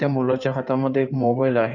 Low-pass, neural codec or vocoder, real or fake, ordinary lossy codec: 7.2 kHz; codec, 16 kHz in and 24 kHz out, 2.2 kbps, FireRedTTS-2 codec; fake; none